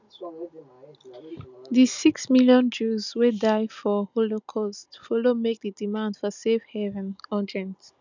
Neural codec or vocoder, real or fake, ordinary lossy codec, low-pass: none; real; none; 7.2 kHz